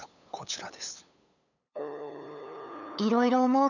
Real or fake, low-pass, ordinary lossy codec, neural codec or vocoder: fake; 7.2 kHz; none; codec, 16 kHz, 8 kbps, FunCodec, trained on LibriTTS, 25 frames a second